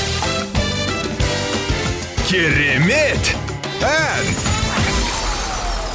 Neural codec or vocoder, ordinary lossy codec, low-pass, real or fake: none; none; none; real